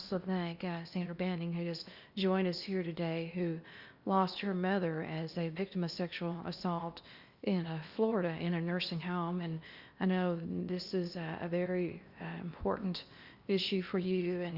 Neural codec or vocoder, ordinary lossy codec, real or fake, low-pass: codec, 16 kHz in and 24 kHz out, 0.8 kbps, FocalCodec, streaming, 65536 codes; Opus, 64 kbps; fake; 5.4 kHz